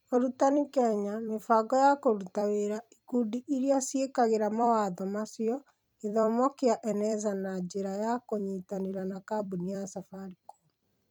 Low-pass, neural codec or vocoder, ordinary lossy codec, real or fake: none; vocoder, 44.1 kHz, 128 mel bands every 256 samples, BigVGAN v2; none; fake